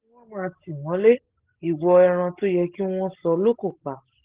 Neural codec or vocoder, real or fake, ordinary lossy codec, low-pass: none; real; Opus, 16 kbps; 3.6 kHz